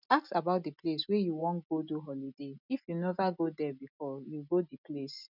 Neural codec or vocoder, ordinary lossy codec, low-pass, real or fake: none; none; 5.4 kHz; real